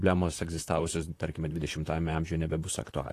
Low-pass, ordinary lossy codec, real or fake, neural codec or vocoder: 14.4 kHz; AAC, 48 kbps; fake; vocoder, 44.1 kHz, 128 mel bands, Pupu-Vocoder